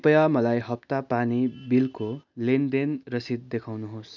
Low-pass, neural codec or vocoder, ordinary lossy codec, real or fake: 7.2 kHz; none; none; real